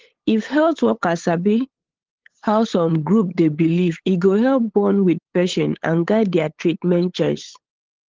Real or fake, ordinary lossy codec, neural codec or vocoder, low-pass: fake; Opus, 16 kbps; codec, 16 kHz, 8 kbps, FunCodec, trained on LibriTTS, 25 frames a second; 7.2 kHz